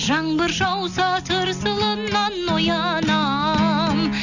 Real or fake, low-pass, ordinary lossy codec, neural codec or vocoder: real; 7.2 kHz; none; none